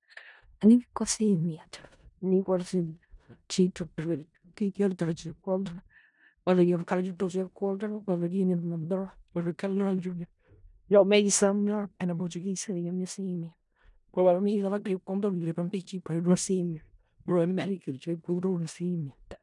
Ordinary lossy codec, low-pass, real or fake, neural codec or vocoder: none; 10.8 kHz; fake; codec, 16 kHz in and 24 kHz out, 0.4 kbps, LongCat-Audio-Codec, four codebook decoder